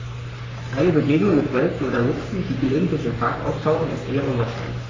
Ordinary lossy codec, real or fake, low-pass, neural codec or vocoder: AAC, 32 kbps; fake; 7.2 kHz; codec, 44.1 kHz, 3.4 kbps, Pupu-Codec